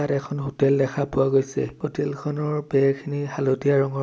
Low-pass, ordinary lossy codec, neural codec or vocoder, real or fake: none; none; none; real